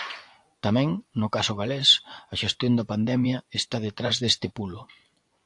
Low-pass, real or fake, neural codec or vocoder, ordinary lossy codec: 10.8 kHz; fake; vocoder, 24 kHz, 100 mel bands, Vocos; AAC, 64 kbps